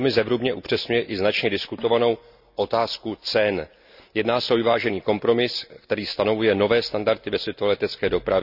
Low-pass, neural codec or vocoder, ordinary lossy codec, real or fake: 5.4 kHz; none; none; real